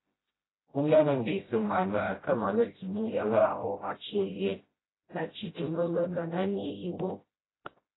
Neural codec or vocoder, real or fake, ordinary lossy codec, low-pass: codec, 16 kHz, 0.5 kbps, FreqCodec, smaller model; fake; AAC, 16 kbps; 7.2 kHz